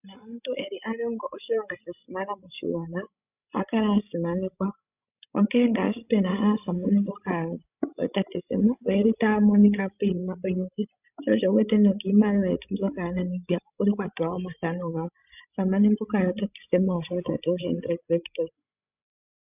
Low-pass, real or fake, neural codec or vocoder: 3.6 kHz; fake; codec, 16 kHz, 16 kbps, FreqCodec, larger model